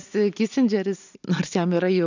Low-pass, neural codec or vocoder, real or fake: 7.2 kHz; none; real